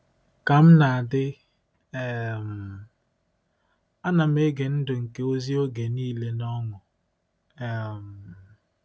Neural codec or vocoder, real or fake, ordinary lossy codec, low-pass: none; real; none; none